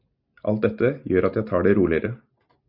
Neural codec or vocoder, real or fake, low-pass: none; real; 5.4 kHz